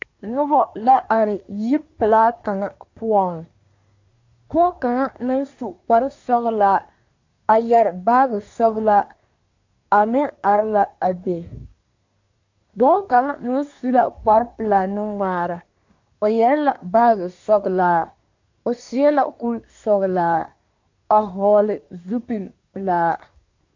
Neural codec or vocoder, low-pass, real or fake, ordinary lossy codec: codec, 24 kHz, 1 kbps, SNAC; 7.2 kHz; fake; AAC, 48 kbps